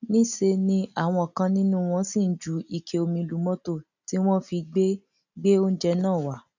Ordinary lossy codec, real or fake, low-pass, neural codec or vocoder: none; real; 7.2 kHz; none